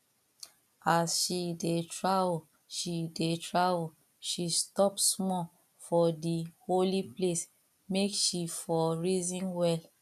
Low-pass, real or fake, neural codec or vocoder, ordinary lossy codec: 14.4 kHz; real; none; none